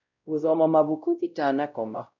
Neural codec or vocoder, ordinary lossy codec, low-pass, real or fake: codec, 16 kHz, 0.5 kbps, X-Codec, WavLM features, trained on Multilingual LibriSpeech; none; 7.2 kHz; fake